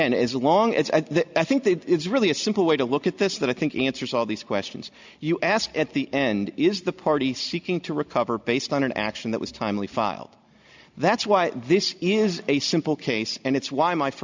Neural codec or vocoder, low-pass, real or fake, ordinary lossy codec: none; 7.2 kHz; real; MP3, 64 kbps